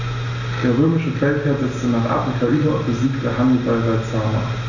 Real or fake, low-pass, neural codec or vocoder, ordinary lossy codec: real; 7.2 kHz; none; none